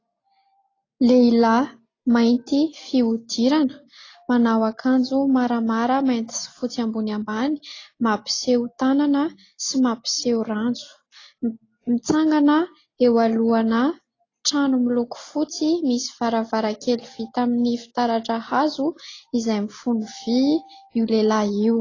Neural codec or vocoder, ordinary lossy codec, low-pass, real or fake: none; AAC, 32 kbps; 7.2 kHz; real